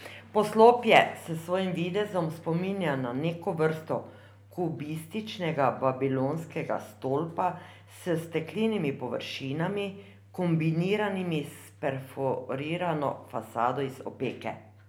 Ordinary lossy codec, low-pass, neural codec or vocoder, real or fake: none; none; none; real